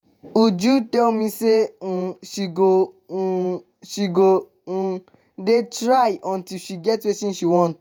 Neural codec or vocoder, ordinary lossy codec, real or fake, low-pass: vocoder, 48 kHz, 128 mel bands, Vocos; none; fake; none